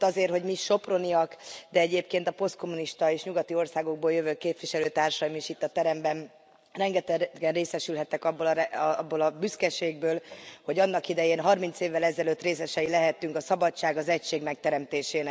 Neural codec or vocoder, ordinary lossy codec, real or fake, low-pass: none; none; real; none